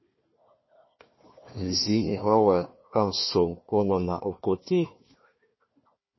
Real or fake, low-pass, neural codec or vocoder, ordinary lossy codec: fake; 7.2 kHz; codec, 16 kHz, 1 kbps, FunCodec, trained on Chinese and English, 50 frames a second; MP3, 24 kbps